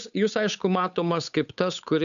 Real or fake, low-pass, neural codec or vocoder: fake; 7.2 kHz; codec, 16 kHz, 8 kbps, FunCodec, trained on Chinese and English, 25 frames a second